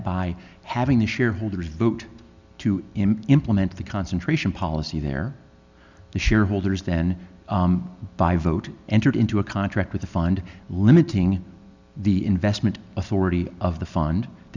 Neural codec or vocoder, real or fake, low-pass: none; real; 7.2 kHz